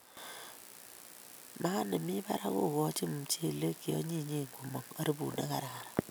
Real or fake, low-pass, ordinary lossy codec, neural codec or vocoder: real; none; none; none